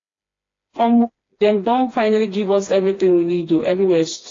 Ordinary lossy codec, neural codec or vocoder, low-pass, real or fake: AAC, 32 kbps; codec, 16 kHz, 2 kbps, FreqCodec, smaller model; 7.2 kHz; fake